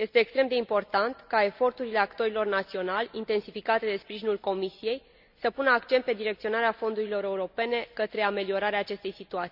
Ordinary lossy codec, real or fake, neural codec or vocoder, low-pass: none; real; none; 5.4 kHz